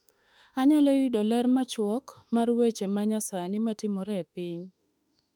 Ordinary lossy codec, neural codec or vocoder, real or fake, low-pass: none; autoencoder, 48 kHz, 32 numbers a frame, DAC-VAE, trained on Japanese speech; fake; 19.8 kHz